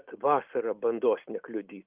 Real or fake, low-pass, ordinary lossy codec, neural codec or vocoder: real; 3.6 kHz; Opus, 24 kbps; none